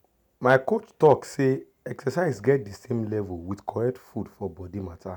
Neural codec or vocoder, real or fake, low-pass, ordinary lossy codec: none; real; 19.8 kHz; none